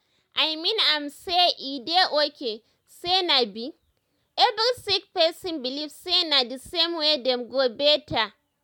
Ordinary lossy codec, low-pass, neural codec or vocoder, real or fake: none; 19.8 kHz; none; real